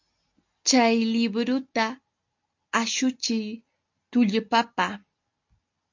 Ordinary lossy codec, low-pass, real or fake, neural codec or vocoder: MP3, 48 kbps; 7.2 kHz; real; none